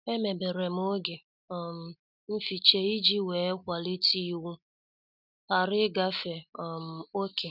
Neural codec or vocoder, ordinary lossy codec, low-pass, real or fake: none; none; 5.4 kHz; real